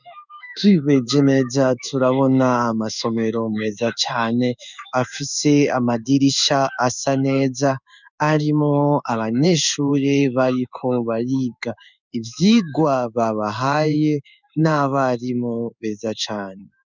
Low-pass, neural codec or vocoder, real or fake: 7.2 kHz; codec, 16 kHz in and 24 kHz out, 1 kbps, XY-Tokenizer; fake